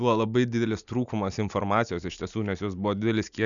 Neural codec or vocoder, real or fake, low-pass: none; real; 7.2 kHz